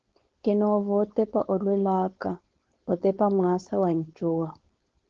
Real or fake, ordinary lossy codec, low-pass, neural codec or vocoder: fake; Opus, 16 kbps; 7.2 kHz; codec, 16 kHz, 4.8 kbps, FACodec